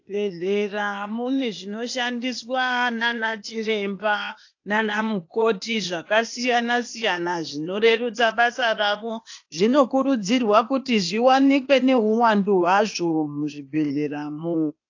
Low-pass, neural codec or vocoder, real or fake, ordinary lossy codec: 7.2 kHz; codec, 16 kHz, 0.8 kbps, ZipCodec; fake; AAC, 48 kbps